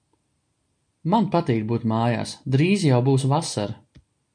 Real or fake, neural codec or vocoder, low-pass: real; none; 9.9 kHz